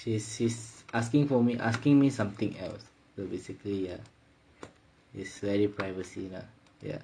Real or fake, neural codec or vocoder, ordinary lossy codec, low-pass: real; none; MP3, 48 kbps; 9.9 kHz